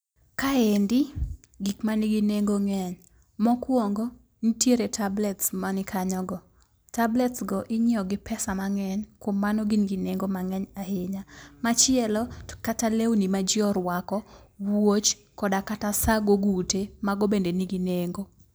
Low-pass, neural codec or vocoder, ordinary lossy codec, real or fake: none; none; none; real